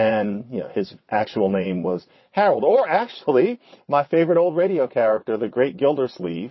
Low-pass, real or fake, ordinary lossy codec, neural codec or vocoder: 7.2 kHz; fake; MP3, 24 kbps; vocoder, 22.05 kHz, 80 mel bands, WaveNeXt